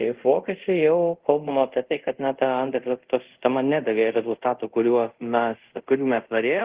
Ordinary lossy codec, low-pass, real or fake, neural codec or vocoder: Opus, 16 kbps; 3.6 kHz; fake; codec, 24 kHz, 0.5 kbps, DualCodec